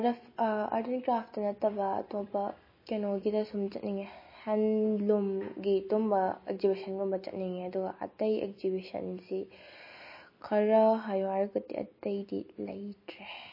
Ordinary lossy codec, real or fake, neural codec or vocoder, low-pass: MP3, 24 kbps; real; none; 5.4 kHz